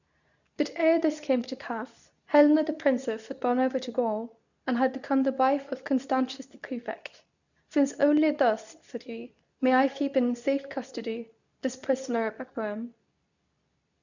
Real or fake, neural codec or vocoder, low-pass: fake; codec, 24 kHz, 0.9 kbps, WavTokenizer, medium speech release version 2; 7.2 kHz